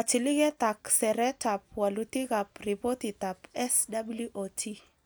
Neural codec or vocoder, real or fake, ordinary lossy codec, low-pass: none; real; none; none